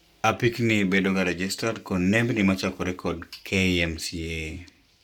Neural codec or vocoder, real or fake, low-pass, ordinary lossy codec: codec, 44.1 kHz, 7.8 kbps, Pupu-Codec; fake; 19.8 kHz; none